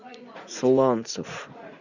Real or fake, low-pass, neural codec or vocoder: fake; 7.2 kHz; vocoder, 44.1 kHz, 80 mel bands, Vocos